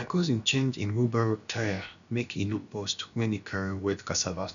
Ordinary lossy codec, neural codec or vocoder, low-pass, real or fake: none; codec, 16 kHz, about 1 kbps, DyCAST, with the encoder's durations; 7.2 kHz; fake